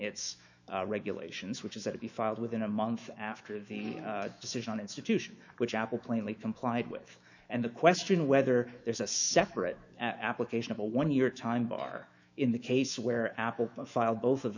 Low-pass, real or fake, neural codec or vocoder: 7.2 kHz; fake; autoencoder, 48 kHz, 128 numbers a frame, DAC-VAE, trained on Japanese speech